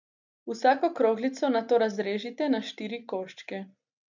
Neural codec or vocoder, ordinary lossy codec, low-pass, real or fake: none; none; 7.2 kHz; real